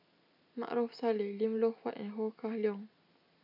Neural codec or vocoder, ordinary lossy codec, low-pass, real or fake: none; none; 5.4 kHz; real